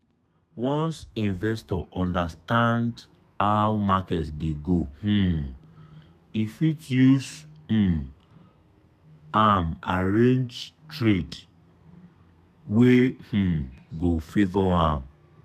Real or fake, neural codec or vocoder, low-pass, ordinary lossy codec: fake; codec, 32 kHz, 1.9 kbps, SNAC; 14.4 kHz; none